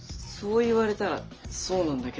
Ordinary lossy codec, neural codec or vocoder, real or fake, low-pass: Opus, 24 kbps; none; real; 7.2 kHz